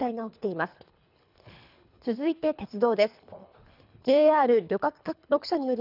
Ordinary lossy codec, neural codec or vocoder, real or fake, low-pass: none; codec, 24 kHz, 3 kbps, HILCodec; fake; 5.4 kHz